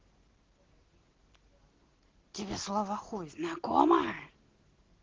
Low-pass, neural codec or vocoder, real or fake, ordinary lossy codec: 7.2 kHz; none; real; Opus, 16 kbps